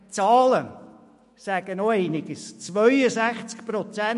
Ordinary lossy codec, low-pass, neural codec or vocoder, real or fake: MP3, 48 kbps; 14.4 kHz; autoencoder, 48 kHz, 128 numbers a frame, DAC-VAE, trained on Japanese speech; fake